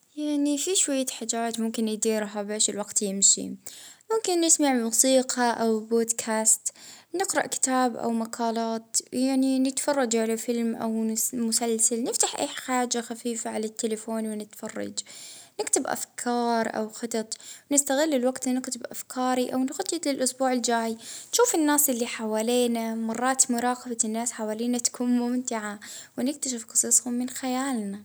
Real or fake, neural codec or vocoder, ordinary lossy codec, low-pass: real; none; none; none